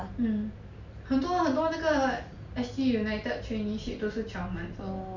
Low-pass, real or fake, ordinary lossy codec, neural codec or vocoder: 7.2 kHz; real; none; none